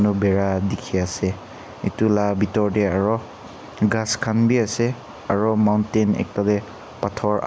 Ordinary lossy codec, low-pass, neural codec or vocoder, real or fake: none; none; none; real